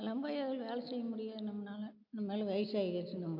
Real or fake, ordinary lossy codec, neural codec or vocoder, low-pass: real; none; none; 5.4 kHz